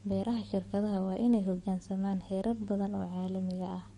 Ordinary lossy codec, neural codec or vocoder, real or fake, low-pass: MP3, 48 kbps; codec, 44.1 kHz, 7.8 kbps, DAC; fake; 19.8 kHz